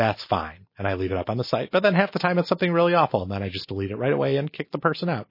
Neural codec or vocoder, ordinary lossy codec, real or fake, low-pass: none; MP3, 24 kbps; real; 5.4 kHz